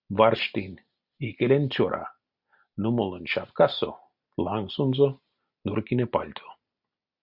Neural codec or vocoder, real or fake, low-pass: none; real; 5.4 kHz